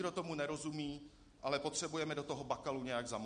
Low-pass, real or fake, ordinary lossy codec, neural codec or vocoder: 9.9 kHz; real; MP3, 48 kbps; none